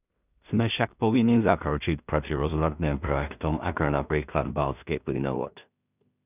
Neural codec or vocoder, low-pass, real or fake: codec, 16 kHz in and 24 kHz out, 0.4 kbps, LongCat-Audio-Codec, two codebook decoder; 3.6 kHz; fake